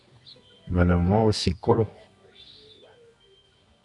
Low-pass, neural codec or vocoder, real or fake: 10.8 kHz; codec, 24 kHz, 0.9 kbps, WavTokenizer, medium music audio release; fake